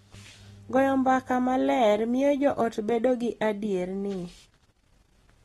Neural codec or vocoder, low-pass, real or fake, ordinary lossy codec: none; 19.8 kHz; real; AAC, 32 kbps